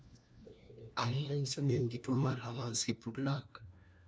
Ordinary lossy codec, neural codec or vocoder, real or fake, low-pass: none; codec, 16 kHz, 1 kbps, FunCodec, trained on LibriTTS, 50 frames a second; fake; none